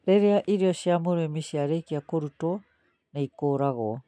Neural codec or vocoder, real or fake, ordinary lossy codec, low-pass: none; real; MP3, 96 kbps; 9.9 kHz